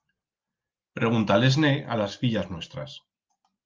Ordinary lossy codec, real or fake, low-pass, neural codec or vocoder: Opus, 32 kbps; real; 7.2 kHz; none